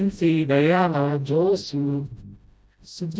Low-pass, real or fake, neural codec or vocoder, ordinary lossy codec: none; fake; codec, 16 kHz, 0.5 kbps, FreqCodec, smaller model; none